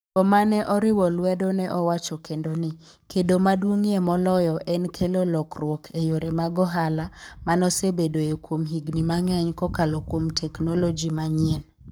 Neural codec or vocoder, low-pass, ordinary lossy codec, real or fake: codec, 44.1 kHz, 7.8 kbps, Pupu-Codec; none; none; fake